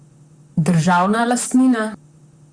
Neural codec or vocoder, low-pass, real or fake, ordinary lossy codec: vocoder, 44.1 kHz, 128 mel bands, Pupu-Vocoder; 9.9 kHz; fake; Opus, 64 kbps